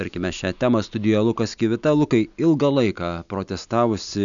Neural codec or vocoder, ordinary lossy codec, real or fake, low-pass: none; MP3, 96 kbps; real; 7.2 kHz